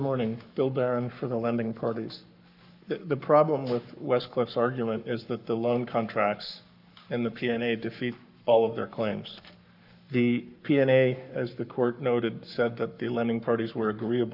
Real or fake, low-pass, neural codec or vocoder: fake; 5.4 kHz; codec, 44.1 kHz, 7.8 kbps, Pupu-Codec